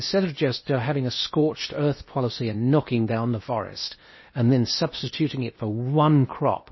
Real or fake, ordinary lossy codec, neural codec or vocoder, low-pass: fake; MP3, 24 kbps; codec, 16 kHz in and 24 kHz out, 0.8 kbps, FocalCodec, streaming, 65536 codes; 7.2 kHz